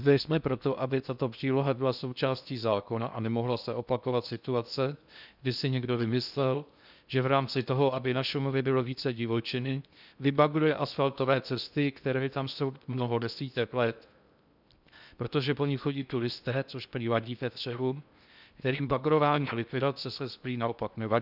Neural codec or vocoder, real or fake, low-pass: codec, 16 kHz in and 24 kHz out, 0.6 kbps, FocalCodec, streaming, 2048 codes; fake; 5.4 kHz